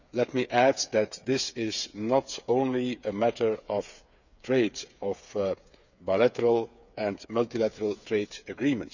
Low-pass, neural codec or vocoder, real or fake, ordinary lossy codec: 7.2 kHz; codec, 16 kHz, 8 kbps, FreqCodec, smaller model; fake; none